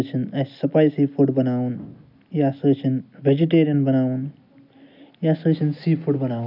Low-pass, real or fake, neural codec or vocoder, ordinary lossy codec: 5.4 kHz; real; none; none